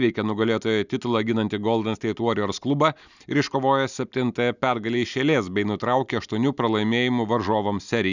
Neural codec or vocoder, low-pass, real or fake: none; 7.2 kHz; real